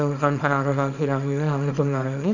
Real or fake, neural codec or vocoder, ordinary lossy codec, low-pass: fake; codec, 24 kHz, 0.9 kbps, WavTokenizer, small release; none; 7.2 kHz